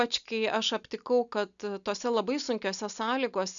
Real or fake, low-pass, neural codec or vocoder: real; 7.2 kHz; none